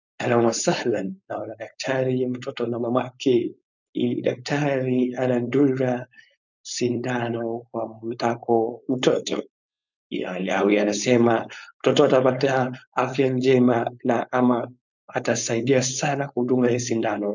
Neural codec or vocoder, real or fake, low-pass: codec, 16 kHz, 4.8 kbps, FACodec; fake; 7.2 kHz